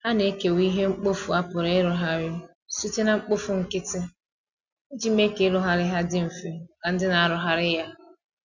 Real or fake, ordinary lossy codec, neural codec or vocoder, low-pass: real; none; none; 7.2 kHz